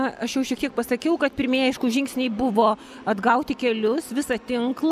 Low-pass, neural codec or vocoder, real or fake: 14.4 kHz; none; real